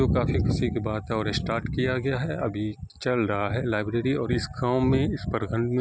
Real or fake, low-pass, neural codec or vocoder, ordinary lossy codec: real; none; none; none